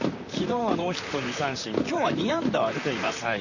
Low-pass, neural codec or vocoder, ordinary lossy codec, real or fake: 7.2 kHz; vocoder, 44.1 kHz, 128 mel bands, Pupu-Vocoder; none; fake